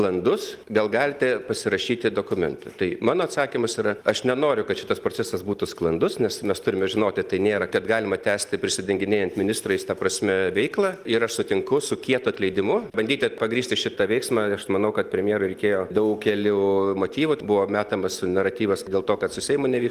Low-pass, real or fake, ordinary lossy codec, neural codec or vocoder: 14.4 kHz; real; Opus, 24 kbps; none